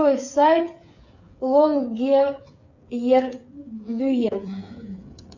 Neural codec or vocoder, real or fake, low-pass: codec, 16 kHz, 8 kbps, FreqCodec, smaller model; fake; 7.2 kHz